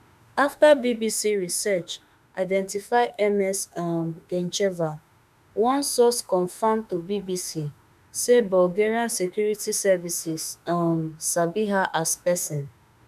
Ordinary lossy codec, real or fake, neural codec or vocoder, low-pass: none; fake; autoencoder, 48 kHz, 32 numbers a frame, DAC-VAE, trained on Japanese speech; 14.4 kHz